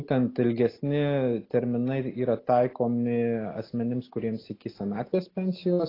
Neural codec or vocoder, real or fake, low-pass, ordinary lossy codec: none; real; 5.4 kHz; AAC, 24 kbps